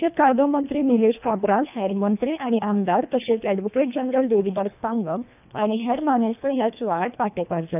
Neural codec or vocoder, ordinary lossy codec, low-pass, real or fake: codec, 24 kHz, 1.5 kbps, HILCodec; none; 3.6 kHz; fake